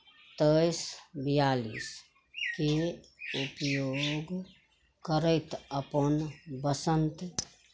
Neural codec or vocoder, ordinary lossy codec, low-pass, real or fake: none; none; none; real